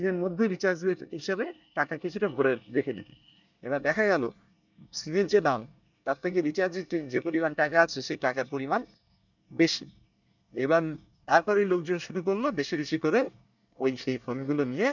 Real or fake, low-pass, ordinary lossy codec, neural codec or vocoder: fake; 7.2 kHz; none; codec, 24 kHz, 1 kbps, SNAC